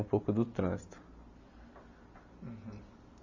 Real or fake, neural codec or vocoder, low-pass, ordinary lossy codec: real; none; 7.2 kHz; none